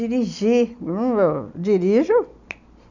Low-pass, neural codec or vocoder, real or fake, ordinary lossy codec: 7.2 kHz; none; real; none